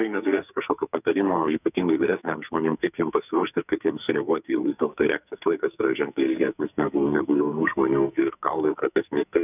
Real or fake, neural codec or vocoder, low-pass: fake; codec, 44.1 kHz, 2.6 kbps, SNAC; 3.6 kHz